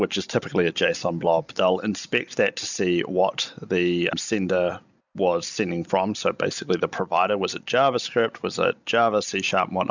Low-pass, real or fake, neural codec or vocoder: 7.2 kHz; real; none